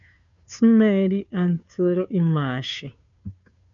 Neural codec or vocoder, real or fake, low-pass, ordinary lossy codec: codec, 16 kHz, 2 kbps, FunCodec, trained on Chinese and English, 25 frames a second; fake; 7.2 kHz; MP3, 96 kbps